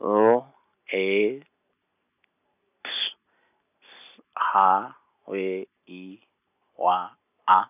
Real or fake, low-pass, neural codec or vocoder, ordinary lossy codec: real; 3.6 kHz; none; none